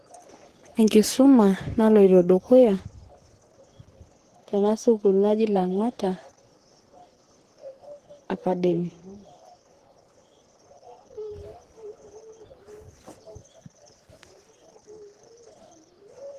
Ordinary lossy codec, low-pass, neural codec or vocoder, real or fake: Opus, 16 kbps; 14.4 kHz; codec, 44.1 kHz, 3.4 kbps, Pupu-Codec; fake